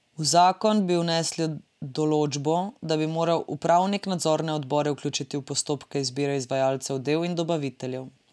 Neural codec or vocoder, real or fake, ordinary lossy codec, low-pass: none; real; none; none